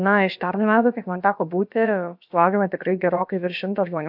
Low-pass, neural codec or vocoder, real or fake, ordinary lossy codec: 5.4 kHz; codec, 16 kHz, about 1 kbps, DyCAST, with the encoder's durations; fake; AAC, 48 kbps